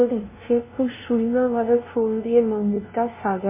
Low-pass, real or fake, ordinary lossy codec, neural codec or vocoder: 3.6 kHz; fake; MP3, 16 kbps; codec, 16 kHz, 0.5 kbps, FunCodec, trained on LibriTTS, 25 frames a second